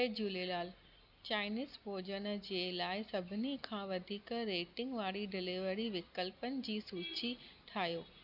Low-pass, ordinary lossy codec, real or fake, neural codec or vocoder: 5.4 kHz; none; real; none